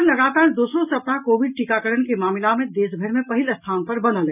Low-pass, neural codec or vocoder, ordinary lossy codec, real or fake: 3.6 kHz; none; none; real